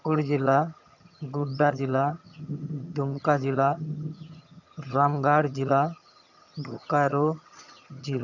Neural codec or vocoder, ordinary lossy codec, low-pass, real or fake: vocoder, 22.05 kHz, 80 mel bands, HiFi-GAN; none; 7.2 kHz; fake